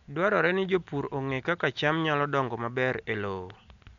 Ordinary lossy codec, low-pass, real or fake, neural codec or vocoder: none; 7.2 kHz; real; none